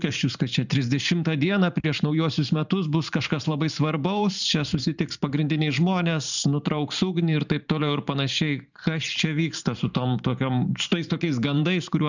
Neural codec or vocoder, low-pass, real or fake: vocoder, 44.1 kHz, 128 mel bands every 512 samples, BigVGAN v2; 7.2 kHz; fake